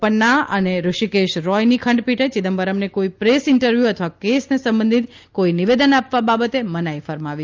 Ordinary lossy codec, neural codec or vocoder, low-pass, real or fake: Opus, 24 kbps; none; 7.2 kHz; real